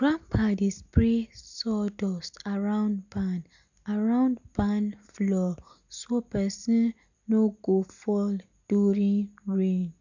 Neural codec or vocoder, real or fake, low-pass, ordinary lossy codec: none; real; 7.2 kHz; none